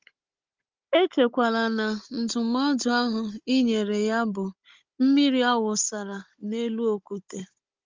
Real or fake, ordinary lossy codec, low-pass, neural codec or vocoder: fake; Opus, 32 kbps; 7.2 kHz; codec, 16 kHz, 16 kbps, FunCodec, trained on Chinese and English, 50 frames a second